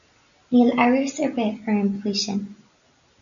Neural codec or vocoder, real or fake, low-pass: none; real; 7.2 kHz